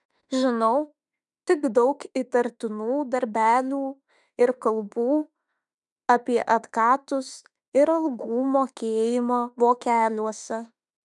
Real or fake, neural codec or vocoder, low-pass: fake; autoencoder, 48 kHz, 32 numbers a frame, DAC-VAE, trained on Japanese speech; 10.8 kHz